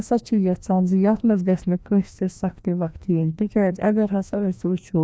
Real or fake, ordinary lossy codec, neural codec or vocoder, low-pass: fake; none; codec, 16 kHz, 1 kbps, FreqCodec, larger model; none